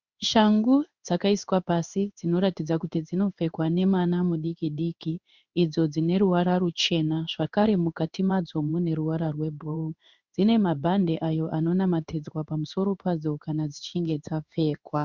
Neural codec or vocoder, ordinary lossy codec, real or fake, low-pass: codec, 16 kHz in and 24 kHz out, 1 kbps, XY-Tokenizer; Opus, 64 kbps; fake; 7.2 kHz